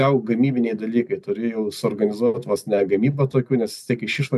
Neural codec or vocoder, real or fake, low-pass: none; real; 14.4 kHz